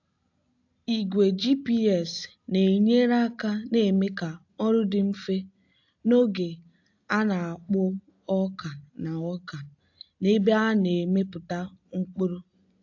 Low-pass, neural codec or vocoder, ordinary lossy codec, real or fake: 7.2 kHz; none; AAC, 48 kbps; real